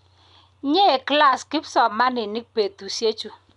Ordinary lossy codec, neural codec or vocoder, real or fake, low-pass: none; none; real; 10.8 kHz